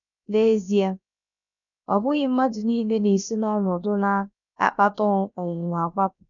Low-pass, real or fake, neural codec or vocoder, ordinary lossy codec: 7.2 kHz; fake; codec, 16 kHz, about 1 kbps, DyCAST, with the encoder's durations; none